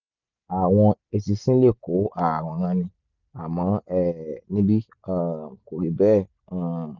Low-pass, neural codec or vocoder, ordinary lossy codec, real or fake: 7.2 kHz; none; none; real